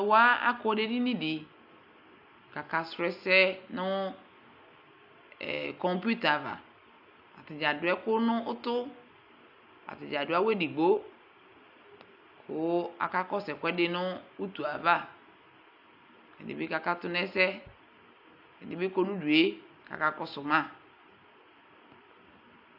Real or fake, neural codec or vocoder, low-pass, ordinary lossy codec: real; none; 5.4 kHz; AAC, 48 kbps